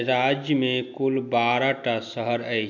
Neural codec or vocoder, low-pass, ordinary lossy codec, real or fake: none; 7.2 kHz; none; real